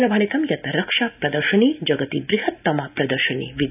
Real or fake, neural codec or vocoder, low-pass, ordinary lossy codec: real; none; 3.6 kHz; none